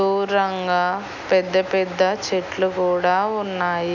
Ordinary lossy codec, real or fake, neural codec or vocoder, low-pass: none; real; none; 7.2 kHz